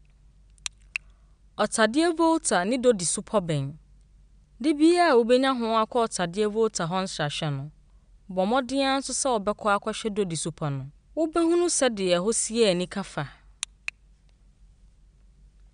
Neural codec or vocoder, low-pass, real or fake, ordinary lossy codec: none; 9.9 kHz; real; none